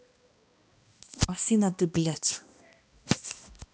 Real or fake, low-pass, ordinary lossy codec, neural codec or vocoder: fake; none; none; codec, 16 kHz, 1 kbps, X-Codec, HuBERT features, trained on balanced general audio